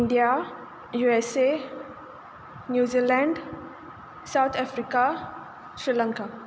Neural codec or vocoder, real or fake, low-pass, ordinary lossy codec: none; real; none; none